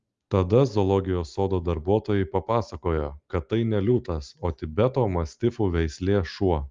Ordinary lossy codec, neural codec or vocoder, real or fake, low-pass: Opus, 32 kbps; none; real; 7.2 kHz